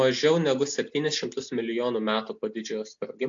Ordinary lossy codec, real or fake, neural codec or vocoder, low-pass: AAC, 64 kbps; real; none; 7.2 kHz